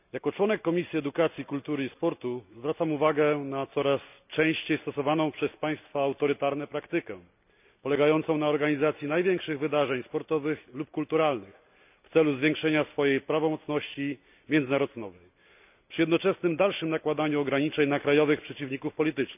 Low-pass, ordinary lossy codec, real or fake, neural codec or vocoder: 3.6 kHz; none; real; none